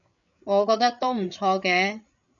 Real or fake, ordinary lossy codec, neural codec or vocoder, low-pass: fake; MP3, 96 kbps; codec, 16 kHz, 8 kbps, FreqCodec, larger model; 7.2 kHz